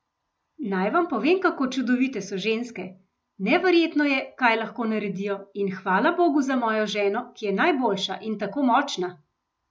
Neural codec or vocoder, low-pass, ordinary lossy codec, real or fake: none; none; none; real